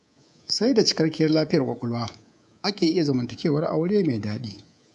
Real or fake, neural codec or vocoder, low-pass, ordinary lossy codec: fake; codec, 24 kHz, 3.1 kbps, DualCodec; 10.8 kHz; none